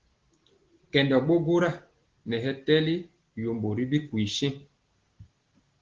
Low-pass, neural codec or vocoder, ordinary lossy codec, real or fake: 7.2 kHz; none; Opus, 16 kbps; real